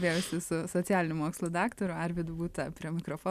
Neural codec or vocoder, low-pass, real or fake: none; 14.4 kHz; real